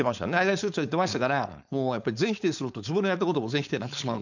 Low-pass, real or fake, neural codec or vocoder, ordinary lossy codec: 7.2 kHz; fake; codec, 16 kHz, 4.8 kbps, FACodec; none